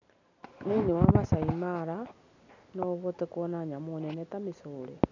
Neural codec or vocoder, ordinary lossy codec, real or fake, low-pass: none; none; real; 7.2 kHz